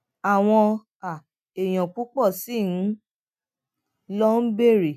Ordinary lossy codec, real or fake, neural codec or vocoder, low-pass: none; real; none; 14.4 kHz